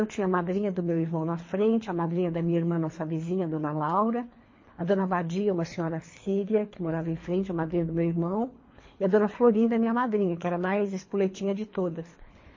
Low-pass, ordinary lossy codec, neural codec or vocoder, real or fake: 7.2 kHz; MP3, 32 kbps; codec, 24 kHz, 3 kbps, HILCodec; fake